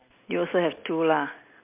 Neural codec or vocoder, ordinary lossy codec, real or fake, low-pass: none; MP3, 32 kbps; real; 3.6 kHz